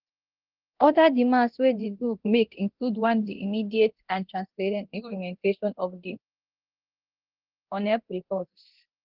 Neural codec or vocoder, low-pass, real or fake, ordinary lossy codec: codec, 24 kHz, 0.9 kbps, DualCodec; 5.4 kHz; fake; Opus, 16 kbps